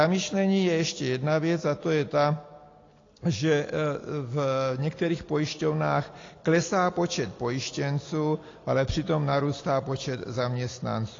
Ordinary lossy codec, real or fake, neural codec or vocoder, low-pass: AAC, 32 kbps; real; none; 7.2 kHz